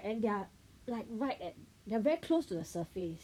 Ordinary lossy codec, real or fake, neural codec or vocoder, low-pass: none; fake; vocoder, 44.1 kHz, 128 mel bands, Pupu-Vocoder; 19.8 kHz